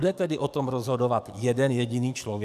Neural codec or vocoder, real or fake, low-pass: codec, 44.1 kHz, 7.8 kbps, DAC; fake; 14.4 kHz